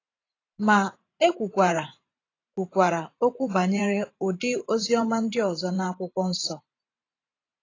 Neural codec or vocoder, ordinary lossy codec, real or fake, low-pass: vocoder, 44.1 kHz, 128 mel bands every 512 samples, BigVGAN v2; AAC, 32 kbps; fake; 7.2 kHz